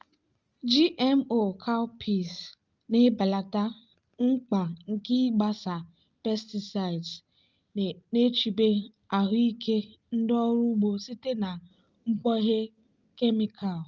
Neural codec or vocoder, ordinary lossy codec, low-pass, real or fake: none; Opus, 24 kbps; 7.2 kHz; real